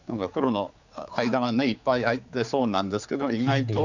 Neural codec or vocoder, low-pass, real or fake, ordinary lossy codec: codec, 16 kHz, 4 kbps, X-Codec, HuBERT features, trained on general audio; 7.2 kHz; fake; none